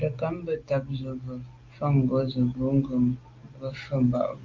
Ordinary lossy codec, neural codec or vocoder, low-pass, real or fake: Opus, 32 kbps; none; 7.2 kHz; real